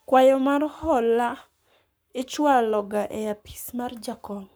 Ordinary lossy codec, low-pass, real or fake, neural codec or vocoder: none; none; fake; codec, 44.1 kHz, 7.8 kbps, Pupu-Codec